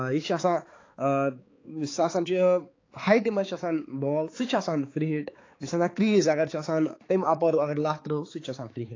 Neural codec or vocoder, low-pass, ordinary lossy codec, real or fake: codec, 16 kHz, 4 kbps, X-Codec, HuBERT features, trained on balanced general audio; 7.2 kHz; AAC, 32 kbps; fake